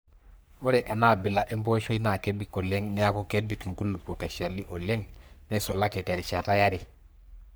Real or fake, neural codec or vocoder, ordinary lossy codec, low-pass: fake; codec, 44.1 kHz, 3.4 kbps, Pupu-Codec; none; none